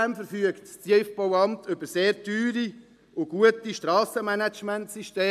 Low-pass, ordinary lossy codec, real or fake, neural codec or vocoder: 14.4 kHz; MP3, 96 kbps; real; none